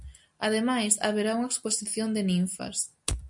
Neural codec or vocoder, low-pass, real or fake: none; 10.8 kHz; real